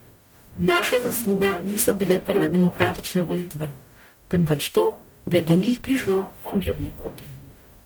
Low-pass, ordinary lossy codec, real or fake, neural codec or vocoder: none; none; fake; codec, 44.1 kHz, 0.9 kbps, DAC